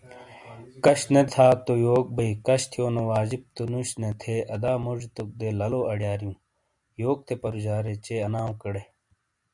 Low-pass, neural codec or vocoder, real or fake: 10.8 kHz; none; real